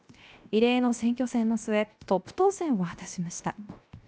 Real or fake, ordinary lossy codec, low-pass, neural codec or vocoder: fake; none; none; codec, 16 kHz, 0.7 kbps, FocalCodec